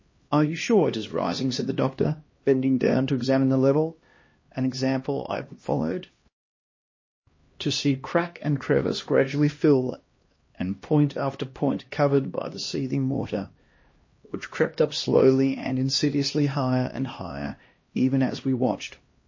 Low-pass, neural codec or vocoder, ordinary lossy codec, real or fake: 7.2 kHz; codec, 16 kHz, 1 kbps, X-Codec, HuBERT features, trained on LibriSpeech; MP3, 32 kbps; fake